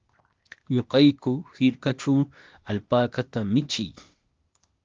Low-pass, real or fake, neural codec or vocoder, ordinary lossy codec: 7.2 kHz; fake; codec, 16 kHz, 0.8 kbps, ZipCodec; Opus, 32 kbps